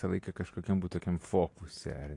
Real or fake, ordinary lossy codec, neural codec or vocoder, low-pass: real; AAC, 48 kbps; none; 10.8 kHz